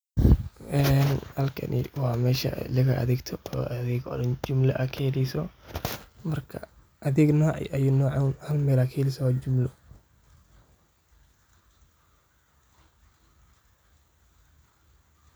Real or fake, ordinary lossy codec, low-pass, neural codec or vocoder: real; none; none; none